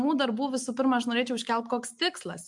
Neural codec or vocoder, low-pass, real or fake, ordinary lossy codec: none; 10.8 kHz; real; MP3, 64 kbps